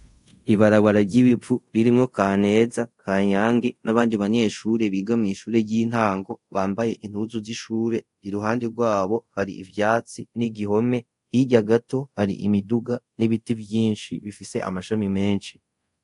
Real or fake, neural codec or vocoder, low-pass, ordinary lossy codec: fake; codec, 24 kHz, 0.5 kbps, DualCodec; 10.8 kHz; AAC, 48 kbps